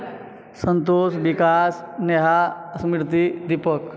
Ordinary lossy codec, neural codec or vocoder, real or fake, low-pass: none; none; real; none